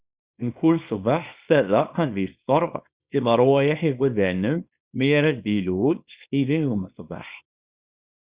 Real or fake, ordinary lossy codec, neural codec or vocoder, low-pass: fake; Opus, 64 kbps; codec, 24 kHz, 0.9 kbps, WavTokenizer, small release; 3.6 kHz